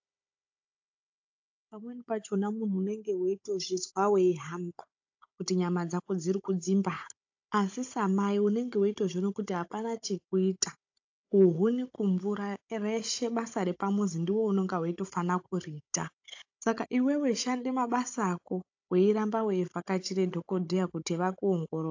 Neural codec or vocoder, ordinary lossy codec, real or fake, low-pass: codec, 16 kHz, 16 kbps, FunCodec, trained on Chinese and English, 50 frames a second; AAC, 48 kbps; fake; 7.2 kHz